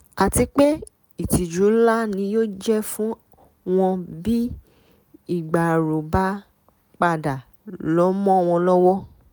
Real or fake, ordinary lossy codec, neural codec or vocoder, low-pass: real; none; none; none